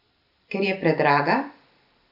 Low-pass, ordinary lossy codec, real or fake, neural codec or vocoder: 5.4 kHz; none; real; none